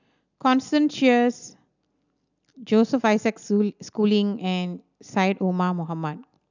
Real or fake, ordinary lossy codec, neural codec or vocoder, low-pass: real; none; none; 7.2 kHz